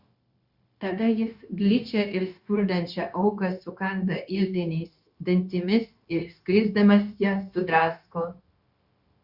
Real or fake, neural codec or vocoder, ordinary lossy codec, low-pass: fake; codec, 16 kHz, 0.9 kbps, LongCat-Audio-Codec; Opus, 64 kbps; 5.4 kHz